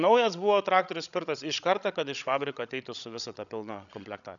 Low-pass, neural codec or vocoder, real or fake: 7.2 kHz; codec, 16 kHz, 16 kbps, FreqCodec, larger model; fake